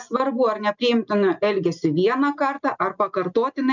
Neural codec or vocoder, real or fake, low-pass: none; real; 7.2 kHz